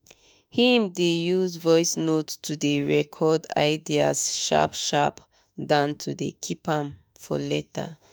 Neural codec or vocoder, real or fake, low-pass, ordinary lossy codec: autoencoder, 48 kHz, 32 numbers a frame, DAC-VAE, trained on Japanese speech; fake; none; none